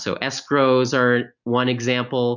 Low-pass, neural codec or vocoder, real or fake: 7.2 kHz; none; real